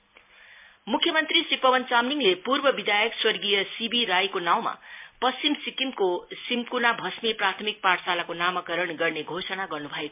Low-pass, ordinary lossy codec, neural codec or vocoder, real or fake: 3.6 kHz; MP3, 32 kbps; none; real